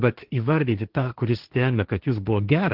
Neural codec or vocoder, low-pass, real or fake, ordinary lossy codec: codec, 16 kHz, 1.1 kbps, Voila-Tokenizer; 5.4 kHz; fake; Opus, 16 kbps